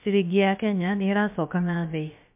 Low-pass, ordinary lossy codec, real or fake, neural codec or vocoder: 3.6 kHz; MP3, 32 kbps; fake; codec, 16 kHz, about 1 kbps, DyCAST, with the encoder's durations